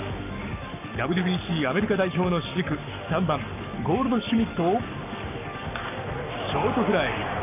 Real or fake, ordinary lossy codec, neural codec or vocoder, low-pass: fake; none; codec, 44.1 kHz, 7.8 kbps, Pupu-Codec; 3.6 kHz